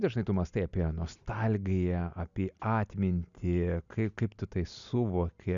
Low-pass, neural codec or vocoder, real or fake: 7.2 kHz; none; real